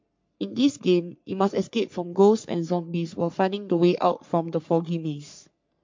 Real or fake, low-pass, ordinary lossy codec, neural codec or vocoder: fake; 7.2 kHz; MP3, 48 kbps; codec, 44.1 kHz, 3.4 kbps, Pupu-Codec